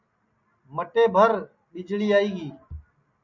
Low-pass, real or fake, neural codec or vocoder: 7.2 kHz; real; none